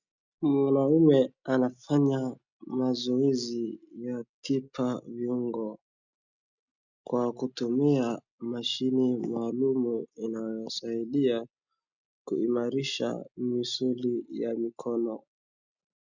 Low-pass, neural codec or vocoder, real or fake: 7.2 kHz; none; real